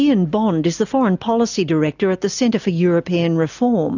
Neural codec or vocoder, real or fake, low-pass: none; real; 7.2 kHz